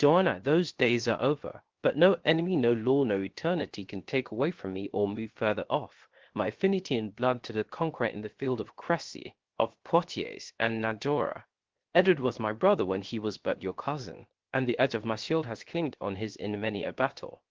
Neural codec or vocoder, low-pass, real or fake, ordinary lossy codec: codec, 16 kHz, 0.7 kbps, FocalCodec; 7.2 kHz; fake; Opus, 16 kbps